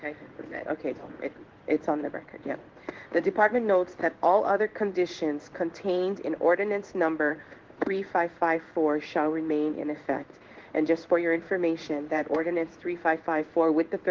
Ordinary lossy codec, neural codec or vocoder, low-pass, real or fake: Opus, 16 kbps; codec, 16 kHz in and 24 kHz out, 1 kbps, XY-Tokenizer; 7.2 kHz; fake